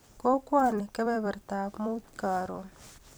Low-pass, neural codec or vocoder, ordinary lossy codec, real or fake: none; vocoder, 44.1 kHz, 128 mel bands every 256 samples, BigVGAN v2; none; fake